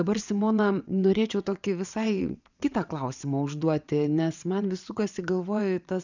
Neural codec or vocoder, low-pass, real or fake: vocoder, 22.05 kHz, 80 mel bands, WaveNeXt; 7.2 kHz; fake